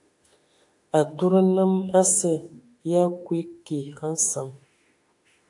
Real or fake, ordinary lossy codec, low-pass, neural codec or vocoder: fake; AAC, 64 kbps; 10.8 kHz; autoencoder, 48 kHz, 32 numbers a frame, DAC-VAE, trained on Japanese speech